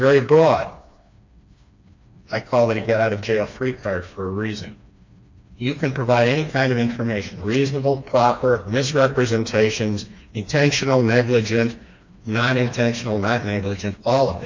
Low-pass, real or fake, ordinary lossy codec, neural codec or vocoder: 7.2 kHz; fake; MP3, 64 kbps; codec, 16 kHz, 2 kbps, FreqCodec, smaller model